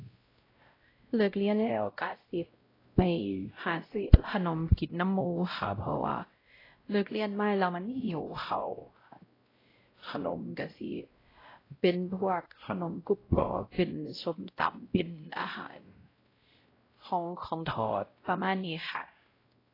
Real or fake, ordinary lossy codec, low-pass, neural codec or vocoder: fake; AAC, 24 kbps; 5.4 kHz; codec, 16 kHz, 0.5 kbps, X-Codec, WavLM features, trained on Multilingual LibriSpeech